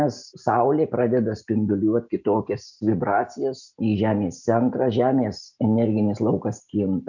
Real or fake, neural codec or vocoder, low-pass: real; none; 7.2 kHz